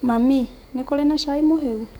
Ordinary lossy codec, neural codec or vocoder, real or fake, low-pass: none; codec, 44.1 kHz, 7.8 kbps, DAC; fake; 19.8 kHz